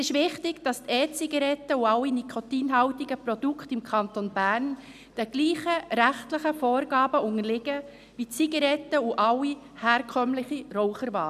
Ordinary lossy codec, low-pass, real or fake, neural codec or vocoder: none; 14.4 kHz; real; none